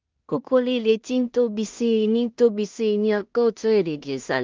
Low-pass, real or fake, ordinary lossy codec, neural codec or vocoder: 7.2 kHz; fake; Opus, 32 kbps; codec, 16 kHz in and 24 kHz out, 0.4 kbps, LongCat-Audio-Codec, two codebook decoder